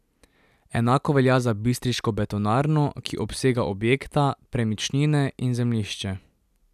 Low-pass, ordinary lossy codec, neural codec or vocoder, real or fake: 14.4 kHz; none; none; real